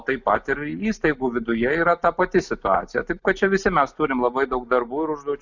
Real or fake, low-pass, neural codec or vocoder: real; 7.2 kHz; none